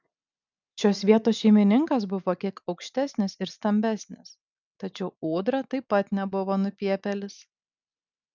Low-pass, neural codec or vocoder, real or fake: 7.2 kHz; none; real